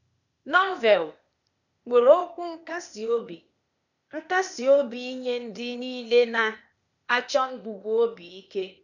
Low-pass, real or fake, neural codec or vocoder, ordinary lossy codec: 7.2 kHz; fake; codec, 16 kHz, 0.8 kbps, ZipCodec; none